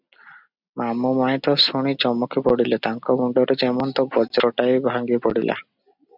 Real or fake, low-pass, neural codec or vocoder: real; 5.4 kHz; none